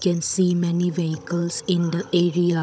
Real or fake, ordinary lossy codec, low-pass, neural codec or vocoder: fake; none; none; codec, 16 kHz, 16 kbps, FunCodec, trained on Chinese and English, 50 frames a second